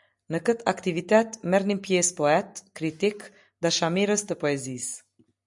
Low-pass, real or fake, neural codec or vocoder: 10.8 kHz; real; none